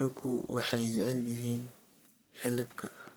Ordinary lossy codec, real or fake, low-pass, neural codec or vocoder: none; fake; none; codec, 44.1 kHz, 1.7 kbps, Pupu-Codec